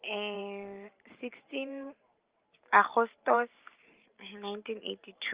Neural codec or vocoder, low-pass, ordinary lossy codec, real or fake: vocoder, 44.1 kHz, 128 mel bands every 512 samples, BigVGAN v2; 3.6 kHz; Opus, 24 kbps; fake